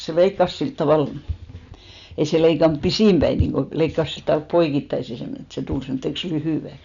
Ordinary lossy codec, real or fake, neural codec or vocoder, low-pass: none; real; none; 7.2 kHz